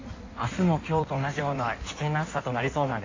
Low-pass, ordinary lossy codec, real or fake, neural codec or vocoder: 7.2 kHz; AAC, 32 kbps; fake; codec, 16 kHz in and 24 kHz out, 1.1 kbps, FireRedTTS-2 codec